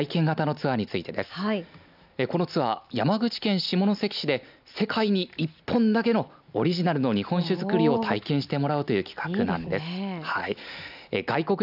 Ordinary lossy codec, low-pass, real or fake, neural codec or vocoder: none; 5.4 kHz; real; none